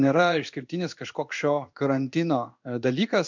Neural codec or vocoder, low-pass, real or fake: codec, 16 kHz in and 24 kHz out, 1 kbps, XY-Tokenizer; 7.2 kHz; fake